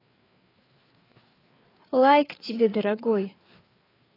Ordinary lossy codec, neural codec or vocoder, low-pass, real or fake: AAC, 24 kbps; codec, 16 kHz, 4 kbps, FreqCodec, larger model; 5.4 kHz; fake